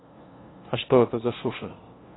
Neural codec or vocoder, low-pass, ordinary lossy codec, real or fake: codec, 16 kHz, 0.5 kbps, FunCodec, trained on LibriTTS, 25 frames a second; 7.2 kHz; AAC, 16 kbps; fake